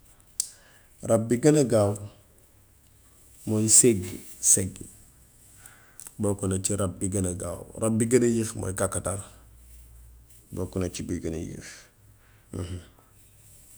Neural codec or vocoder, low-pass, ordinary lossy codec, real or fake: autoencoder, 48 kHz, 128 numbers a frame, DAC-VAE, trained on Japanese speech; none; none; fake